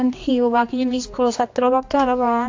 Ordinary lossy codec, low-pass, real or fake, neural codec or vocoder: AAC, 48 kbps; 7.2 kHz; fake; codec, 16 kHz, 2 kbps, X-Codec, HuBERT features, trained on general audio